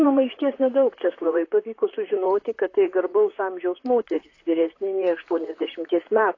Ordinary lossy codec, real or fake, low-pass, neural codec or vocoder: AAC, 32 kbps; fake; 7.2 kHz; vocoder, 44.1 kHz, 128 mel bands, Pupu-Vocoder